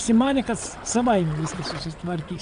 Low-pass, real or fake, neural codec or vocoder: 9.9 kHz; fake; vocoder, 22.05 kHz, 80 mel bands, WaveNeXt